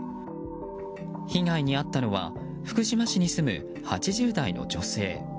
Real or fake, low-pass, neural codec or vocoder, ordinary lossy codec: real; none; none; none